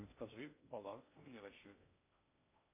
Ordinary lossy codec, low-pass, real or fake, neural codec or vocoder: MP3, 24 kbps; 3.6 kHz; fake; codec, 16 kHz in and 24 kHz out, 0.6 kbps, FocalCodec, streaming, 2048 codes